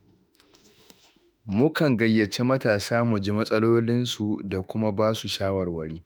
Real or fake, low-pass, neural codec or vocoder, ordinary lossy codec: fake; none; autoencoder, 48 kHz, 32 numbers a frame, DAC-VAE, trained on Japanese speech; none